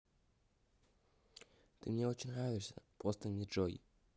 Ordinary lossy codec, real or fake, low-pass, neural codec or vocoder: none; real; none; none